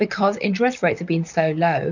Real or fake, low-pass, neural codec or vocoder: fake; 7.2 kHz; vocoder, 44.1 kHz, 128 mel bands, Pupu-Vocoder